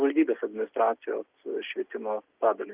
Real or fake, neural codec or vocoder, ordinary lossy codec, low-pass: real; none; Opus, 24 kbps; 3.6 kHz